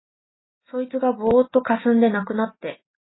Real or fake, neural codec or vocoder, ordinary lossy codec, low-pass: real; none; AAC, 16 kbps; 7.2 kHz